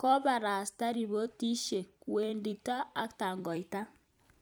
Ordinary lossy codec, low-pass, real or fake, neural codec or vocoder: none; none; fake; vocoder, 44.1 kHz, 128 mel bands every 256 samples, BigVGAN v2